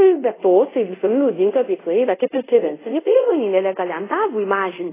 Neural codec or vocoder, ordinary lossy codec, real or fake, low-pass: codec, 24 kHz, 0.5 kbps, DualCodec; AAC, 16 kbps; fake; 3.6 kHz